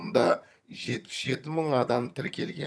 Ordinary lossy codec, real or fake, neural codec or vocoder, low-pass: none; fake; vocoder, 22.05 kHz, 80 mel bands, HiFi-GAN; none